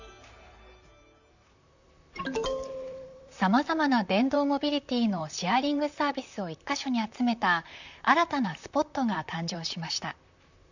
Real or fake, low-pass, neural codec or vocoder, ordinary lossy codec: fake; 7.2 kHz; vocoder, 44.1 kHz, 128 mel bands, Pupu-Vocoder; none